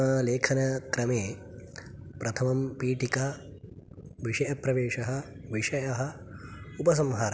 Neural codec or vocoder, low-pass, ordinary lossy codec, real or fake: none; none; none; real